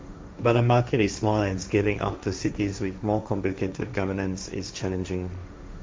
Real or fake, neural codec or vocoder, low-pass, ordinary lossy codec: fake; codec, 16 kHz, 1.1 kbps, Voila-Tokenizer; none; none